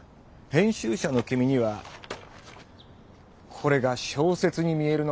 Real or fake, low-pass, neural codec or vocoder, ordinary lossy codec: real; none; none; none